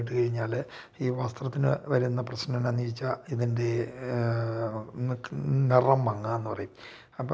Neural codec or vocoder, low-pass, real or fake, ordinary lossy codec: none; none; real; none